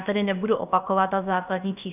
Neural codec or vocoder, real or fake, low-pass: codec, 16 kHz, about 1 kbps, DyCAST, with the encoder's durations; fake; 3.6 kHz